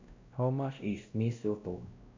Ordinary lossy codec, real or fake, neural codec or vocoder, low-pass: MP3, 64 kbps; fake; codec, 16 kHz, 1 kbps, X-Codec, WavLM features, trained on Multilingual LibriSpeech; 7.2 kHz